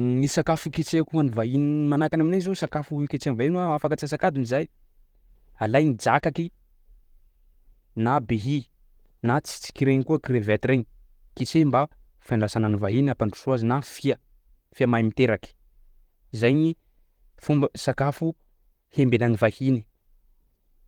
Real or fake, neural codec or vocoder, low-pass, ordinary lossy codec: fake; vocoder, 44.1 kHz, 128 mel bands, Pupu-Vocoder; 19.8 kHz; Opus, 24 kbps